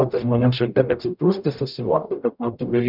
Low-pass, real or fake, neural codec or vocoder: 5.4 kHz; fake; codec, 44.1 kHz, 0.9 kbps, DAC